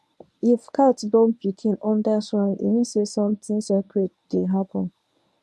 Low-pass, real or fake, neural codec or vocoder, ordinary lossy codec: none; fake; codec, 24 kHz, 0.9 kbps, WavTokenizer, medium speech release version 1; none